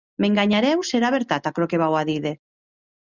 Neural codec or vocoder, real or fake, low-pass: none; real; 7.2 kHz